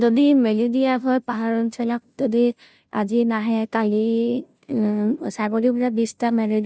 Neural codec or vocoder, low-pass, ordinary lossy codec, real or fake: codec, 16 kHz, 0.5 kbps, FunCodec, trained on Chinese and English, 25 frames a second; none; none; fake